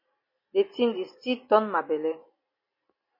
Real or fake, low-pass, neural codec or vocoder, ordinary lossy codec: real; 5.4 kHz; none; MP3, 24 kbps